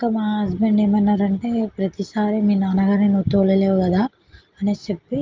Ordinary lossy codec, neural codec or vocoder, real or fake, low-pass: Opus, 32 kbps; none; real; 7.2 kHz